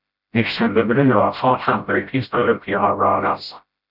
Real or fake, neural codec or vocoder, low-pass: fake; codec, 16 kHz, 0.5 kbps, FreqCodec, smaller model; 5.4 kHz